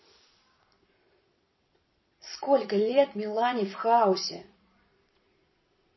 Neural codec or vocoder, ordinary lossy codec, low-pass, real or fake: vocoder, 22.05 kHz, 80 mel bands, Vocos; MP3, 24 kbps; 7.2 kHz; fake